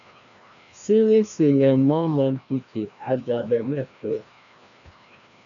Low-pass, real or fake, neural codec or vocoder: 7.2 kHz; fake; codec, 16 kHz, 1 kbps, FreqCodec, larger model